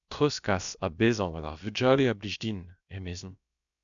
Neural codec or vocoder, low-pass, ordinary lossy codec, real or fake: codec, 16 kHz, about 1 kbps, DyCAST, with the encoder's durations; 7.2 kHz; MP3, 96 kbps; fake